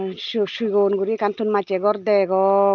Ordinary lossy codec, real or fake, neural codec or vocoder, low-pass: Opus, 32 kbps; real; none; 7.2 kHz